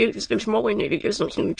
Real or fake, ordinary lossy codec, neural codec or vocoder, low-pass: fake; MP3, 48 kbps; autoencoder, 22.05 kHz, a latent of 192 numbers a frame, VITS, trained on many speakers; 9.9 kHz